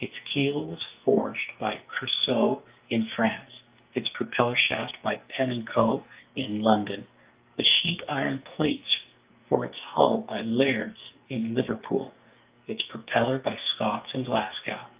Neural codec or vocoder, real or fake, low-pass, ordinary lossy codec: codec, 44.1 kHz, 2.6 kbps, SNAC; fake; 3.6 kHz; Opus, 32 kbps